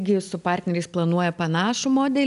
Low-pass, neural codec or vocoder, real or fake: 10.8 kHz; none; real